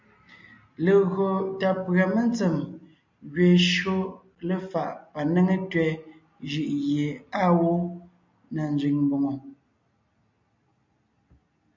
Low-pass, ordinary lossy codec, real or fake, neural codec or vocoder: 7.2 kHz; MP3, 64 kbps; real; none